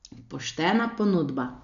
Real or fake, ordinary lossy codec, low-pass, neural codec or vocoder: real; none; 7.2 kHz; none